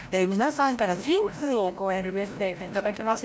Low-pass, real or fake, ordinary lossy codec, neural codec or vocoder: none; fake; none; codec, 16 kHz, 0.5 kbps, FreqCodec, larger model